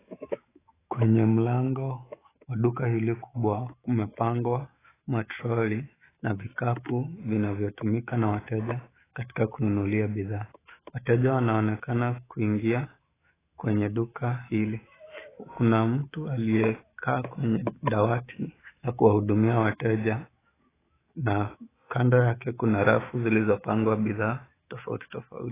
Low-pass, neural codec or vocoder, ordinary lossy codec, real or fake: 3.6 kHz; autoencoder, 48 kHz, 128 numbers a frame, DAC-VAE, trained on Japanese speech; AAC, 16 kbps; fake